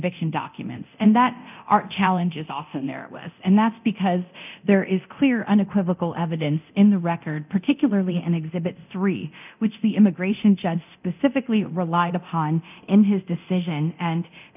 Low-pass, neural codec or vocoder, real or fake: 3.6 kHz; codec, 24 kHz, 0.9 kbps, DualCodec; fake